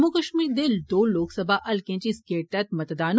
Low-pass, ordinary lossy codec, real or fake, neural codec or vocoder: none; none; real; none